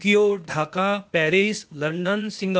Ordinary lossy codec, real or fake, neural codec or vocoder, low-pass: none; fake; codec, 16 kHz, 0.8 kbps, ZipCodec; none